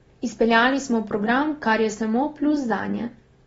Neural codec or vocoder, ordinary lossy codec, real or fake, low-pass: none; AAC, 24 kbps; real; 19.8 kHz